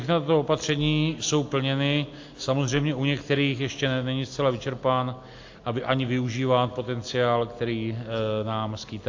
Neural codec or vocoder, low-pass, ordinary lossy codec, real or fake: none; 7.2 kHz; AAC, 48 kbps; real